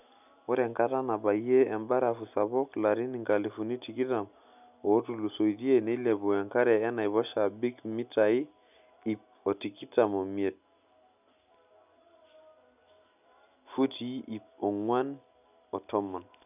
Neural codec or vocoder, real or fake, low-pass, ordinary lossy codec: none; real; 3.6 kHz; none